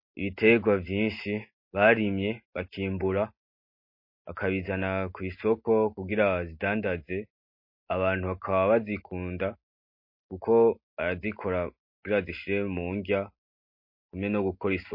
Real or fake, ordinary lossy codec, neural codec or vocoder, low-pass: real; MP3, 32 kbps; none; 5.4 kHz